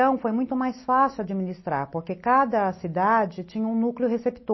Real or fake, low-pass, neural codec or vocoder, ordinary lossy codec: real; 7.2 kHz; none; MP3, 24 kbps